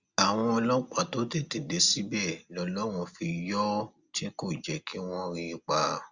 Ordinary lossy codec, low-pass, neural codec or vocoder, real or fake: Opus, 64 kbps; 7.2 kHz; none; real